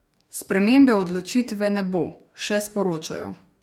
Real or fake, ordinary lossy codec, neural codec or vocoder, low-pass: fake; MP3, 96 kbps; codec, 44.1 kHz, 2.6 kbps, DAC; 19.8 kHz